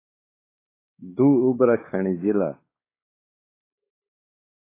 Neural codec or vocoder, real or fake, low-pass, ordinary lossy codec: codec, 16 kHz, 4 kbps, X-Codec, WavLM features, trained on Multilingual LibriSpeech; fake; 3.6 kHz; AAC, 24 kbps